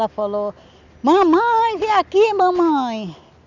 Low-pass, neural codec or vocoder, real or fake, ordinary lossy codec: 7.2 kHz; none; real; none